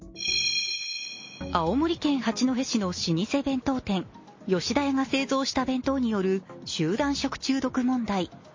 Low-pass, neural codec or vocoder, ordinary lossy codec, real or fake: 7.2 kHz; none; MP3, 32 kbps; real